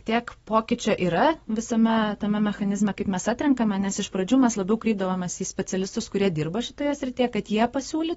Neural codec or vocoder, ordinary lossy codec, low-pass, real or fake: none; AAC, 24 kbps; 19.8 kHz; real